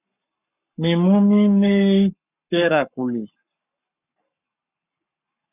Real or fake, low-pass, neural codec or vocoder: fake; 3.6 kHz; codec, 44.1 kHz, 7.8 kbps, Pupu-Codec